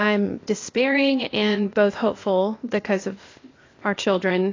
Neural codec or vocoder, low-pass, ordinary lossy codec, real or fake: codec, 16 kHz, 0.8 kbps, ZipCodec; 7.2 kHz; AAC, 32 kbps; fake